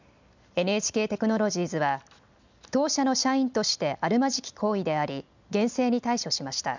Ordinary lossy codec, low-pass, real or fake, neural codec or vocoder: none; 7.2 kHz; real; none